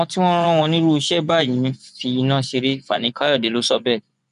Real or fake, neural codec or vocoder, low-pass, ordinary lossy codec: fake; vocoder, 24 kHz, 100 mel bands, Vocos; 10.8 kHz; none